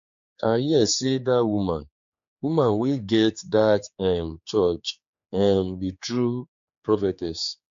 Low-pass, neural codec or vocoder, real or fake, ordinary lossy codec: 7.2 kHz; codec, 16 kHz, 4 kbps, X-Codec, HuBERT features, trained on general audio; fake; MP3, 48 kbps